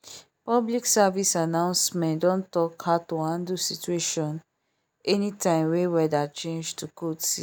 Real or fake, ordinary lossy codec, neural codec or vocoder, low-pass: real; none; none; none